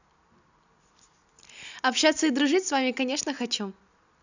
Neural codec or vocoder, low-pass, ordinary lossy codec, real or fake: none; 7.2 kHz; none; real